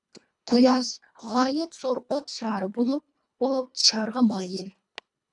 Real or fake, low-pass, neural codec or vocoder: fake; 10.8 kHz; codec, 24 kHz, 1.5 kbps, HILCodec